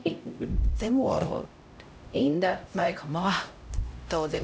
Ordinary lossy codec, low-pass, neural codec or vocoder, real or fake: none; none; codec, 16 kHz, 0.5 kbps, X-Codec, HuBERT features, trained on LibriSpeech; fake